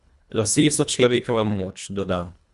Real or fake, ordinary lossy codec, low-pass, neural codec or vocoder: fake; Opus, 64 kbps; 10.8 kHz; codec, 24 kHz, 1.5 kbps, HILCodec